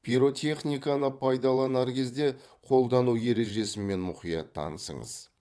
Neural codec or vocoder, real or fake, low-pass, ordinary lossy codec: vocoder, 22.05 kHz, 80 mel bands, WaveNeXt; fake; none; none